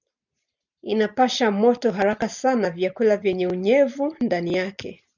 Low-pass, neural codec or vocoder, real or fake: 7.2 kHz; none; real